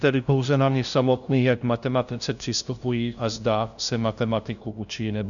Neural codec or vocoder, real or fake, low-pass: codec, 16 kHz, 0.5 kbps, FunCodec, trained on LibriTTS, 25 frames a second; fake; 7.2 kHz